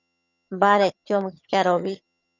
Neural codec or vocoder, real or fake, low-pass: vocoder, 22.05 kHz, 80 mel bands, HiFi-GAN; fake; 7.2 kHz